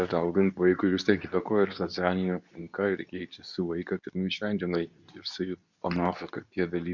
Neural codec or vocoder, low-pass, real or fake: codec, 24 kHz, 0.9 kbps, WavTokenizer, medium speech release version 2; 7.2 kHz; fake